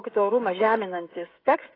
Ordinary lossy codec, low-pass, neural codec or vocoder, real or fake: AAC, 24 kbps; 5.4 kHz; codec, 16 kHz, 16 kbps, FreqCodec, smaller model; fake